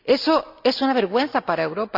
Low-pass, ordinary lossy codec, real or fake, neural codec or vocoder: 5.4 kHz; none; real; none